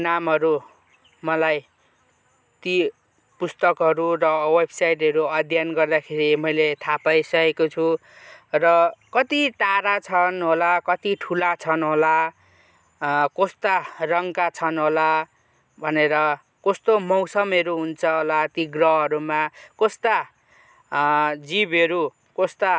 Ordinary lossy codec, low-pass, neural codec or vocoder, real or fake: none; none; none; real